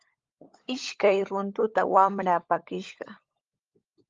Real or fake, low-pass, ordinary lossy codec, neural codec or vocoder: fake; 7.2 kHz; Opus, 32 kbps; codec, 16 kHz, 16 kbps, FunCodec, trained on LibriTTS, 50 frames a second